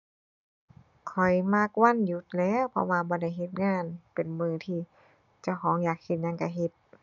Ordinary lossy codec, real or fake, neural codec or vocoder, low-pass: none; real; none; 7.2 kHz